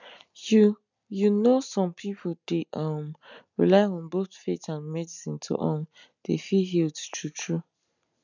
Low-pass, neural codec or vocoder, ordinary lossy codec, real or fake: 7.2 kHz; none; none; real